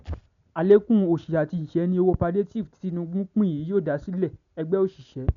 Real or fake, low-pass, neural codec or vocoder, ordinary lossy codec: real; 7.2 kHz; none; MP3, 96 kbps